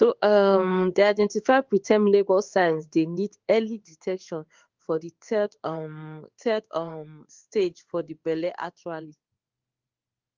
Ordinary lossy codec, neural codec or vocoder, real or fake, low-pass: Opus, 32 kbps; codec, 16 kHz in and 24 kHz out, 1 kbps, XY-Tokenizer; fake; 7.2 kHz